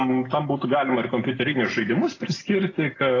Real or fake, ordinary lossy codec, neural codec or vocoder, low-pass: real; AAC, 32 kbps; none; 7.2 kHz